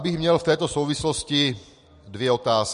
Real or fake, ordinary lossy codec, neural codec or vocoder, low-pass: real; MP3, 48 kbps; none; 10.8 kHz